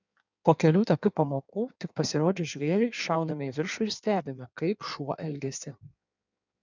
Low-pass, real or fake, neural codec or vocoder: 7.2 kHz; fake; codec, 16 kHz in and 24 kHz out, 1.1 kbps, FireRedTTS-2 codec